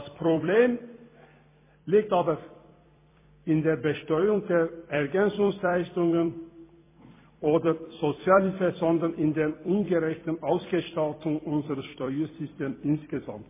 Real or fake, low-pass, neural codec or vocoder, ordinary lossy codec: fake; 3.6 kHz; vocoder, 44.1 kHz, 128 mel bands every 256 samples, BigVGAN v2; MP3, 16 kbps